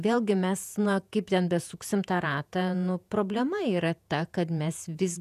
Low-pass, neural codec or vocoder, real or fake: 14.4 kHz; vocoder, 48 kHz, 128 mel bands, Vocos; fake